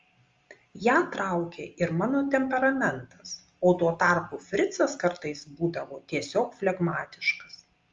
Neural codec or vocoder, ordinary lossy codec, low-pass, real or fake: none; Opus, 32 kbps; 7.2 kHz; real